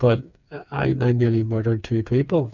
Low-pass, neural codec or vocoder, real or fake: 7.2 kHz; codec, 16 kHz, 4 kbps, FreqCodec, smaller model; fake